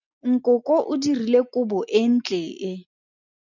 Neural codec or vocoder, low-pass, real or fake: none; 7.2 kHz; real